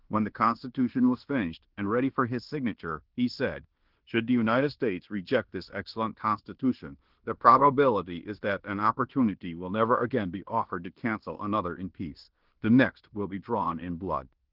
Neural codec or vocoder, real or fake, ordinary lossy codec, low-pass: codec, 16 kHz in and 24 kHz out, 0.9 kbps, LongCat-Audio-Codec, fine tuned four codebook decoder; fake; Opus, 16 kbps; 5.4 kHz